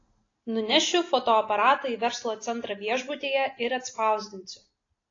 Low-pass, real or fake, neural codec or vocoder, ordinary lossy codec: 7.2 kHz; real; none; AAC, 32 kbps